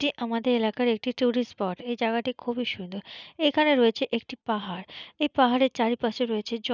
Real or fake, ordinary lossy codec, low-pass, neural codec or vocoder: real; none; 7.2 kHz; none